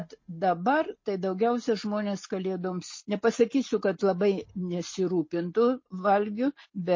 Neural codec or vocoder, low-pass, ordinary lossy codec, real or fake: none; 7.2 kHz; MP3, 32 kbps; real